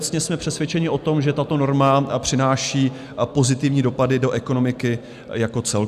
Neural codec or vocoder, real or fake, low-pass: none; real; 14.4 kHz